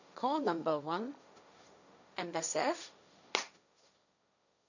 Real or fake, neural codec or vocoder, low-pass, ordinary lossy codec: fake; codec, 16 kHz, 1.1 kbps, Voila-Tokenizer; 7.2 kHz; none